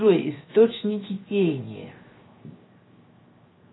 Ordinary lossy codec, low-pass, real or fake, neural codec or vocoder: AAC, 16 kbps; 7.2 kHz; fake; codec, 16 kHz, 0.7 kbps, FocalCodec